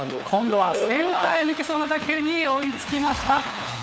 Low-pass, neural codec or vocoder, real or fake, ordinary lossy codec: none; codec, 16 kHz, 4 kbps, FunCodec, trained on LibriTTS, 50 frames a second; fake; none